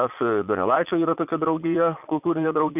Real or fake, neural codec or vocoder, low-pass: fake; vocoder, 22.05 kHz, 80 mel bands, Vocos; 3.6 kHz